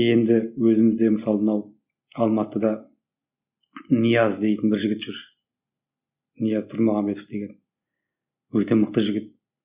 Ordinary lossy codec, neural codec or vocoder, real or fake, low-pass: Opus, 64 kbps; none; real; 3.6 kHz